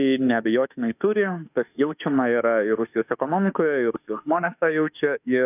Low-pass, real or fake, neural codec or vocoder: 3.6 kHz; fake; autoencoder, 48 kHz, 32 numbers a frame, DAC-VAE, trained on Japanese speech